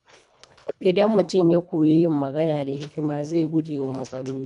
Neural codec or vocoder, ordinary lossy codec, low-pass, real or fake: codec, 24 kHz, 1.5 kbps, HILCodec; none; 10.8 kHz; fake